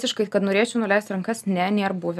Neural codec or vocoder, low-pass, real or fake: none; 14.4 kHz; real